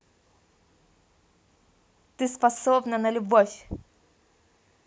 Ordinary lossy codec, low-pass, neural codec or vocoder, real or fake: none; none; none; real